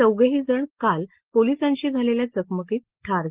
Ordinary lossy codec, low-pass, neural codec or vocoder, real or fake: Opus, 16 kbps; 3.6 kHz; none; real